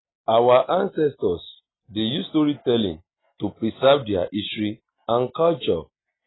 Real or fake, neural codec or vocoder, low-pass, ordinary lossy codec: real; none; 7.2 kHz; AAC, 16 kbps